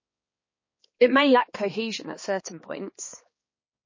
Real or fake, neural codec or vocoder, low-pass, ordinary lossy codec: fake; codec, 16 kHz, 2 kbps, X-Codec, HuBERT features, trained on balanced general audio; 7.2 kHz; MP3, 32 kbps